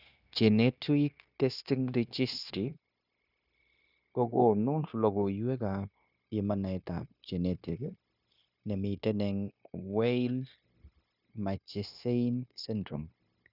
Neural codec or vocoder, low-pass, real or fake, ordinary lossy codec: codec, 16 kHz, 0.9 kbps, LongCat-Audio-Codec; 5.4 kHz; fake; none